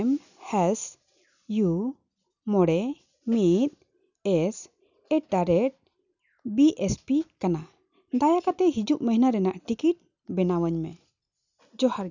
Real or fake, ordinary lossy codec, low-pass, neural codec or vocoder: real; none; 7.2 kHz; none